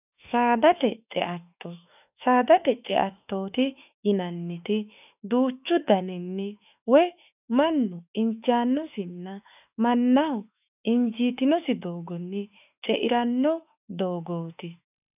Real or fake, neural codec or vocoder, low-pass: fake; autoencoder, 48 kHz, 32 numbers a frame, DAC-VAE, trained on Japanese speech; 3.6 kHz